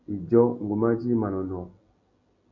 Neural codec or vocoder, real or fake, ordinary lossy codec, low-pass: none; real; MP3, 64 kbps; 7.2 kHz